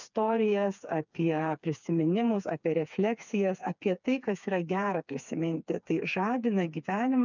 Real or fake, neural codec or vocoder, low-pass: fake; codec, 16 kHz, 4 kbps, FreqCodec, smaller model; 7.2 kHz